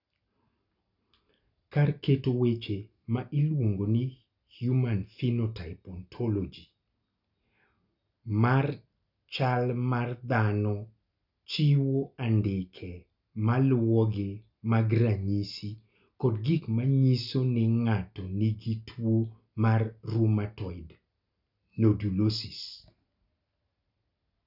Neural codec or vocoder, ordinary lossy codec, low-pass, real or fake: none; none; 5.4 kHz; real